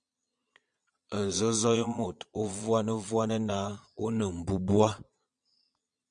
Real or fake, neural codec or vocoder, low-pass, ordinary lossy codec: fake; vocoder, 22.05 kHz, 80 mel bands, Vocos; 9.9 kHz; MP3, 64 kbps